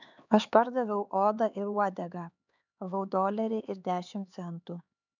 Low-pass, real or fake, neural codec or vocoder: 7.2 kHz; fake; codec, 16 kHz, 4 kbps, X-Codec, HuBERT features, trained on LibriSpeech